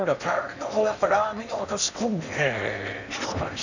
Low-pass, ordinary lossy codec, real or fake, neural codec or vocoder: 7.2 kHz; none; fake; codec, 16 kHz in and 24 kHz out, 0.8 kbps, FocalCodec, streaming, 65536 codes